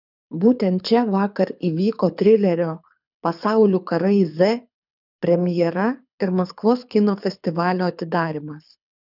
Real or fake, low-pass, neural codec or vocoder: fake; 5.4 kHz; codec, 24 kHz, 6 kbps, HILCodec